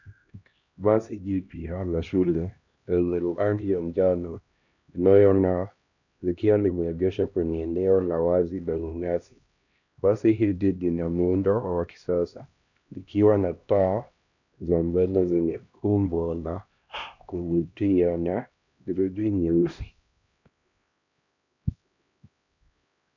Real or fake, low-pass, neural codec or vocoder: fake; 7.2 kHz; codec, 16 kHz, 1 kbps, X-Codec, HuBERT features, trained on LibriSpeech